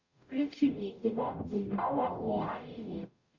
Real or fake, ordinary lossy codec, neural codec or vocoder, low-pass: fake; Opus, 64 kbps; codec, 44.1 kHz, 0.9 kbps, DAC; 7.2 kHz